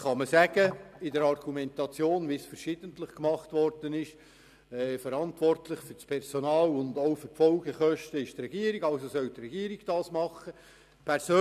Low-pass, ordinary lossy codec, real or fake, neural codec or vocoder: 14.4 kHz; none; real; none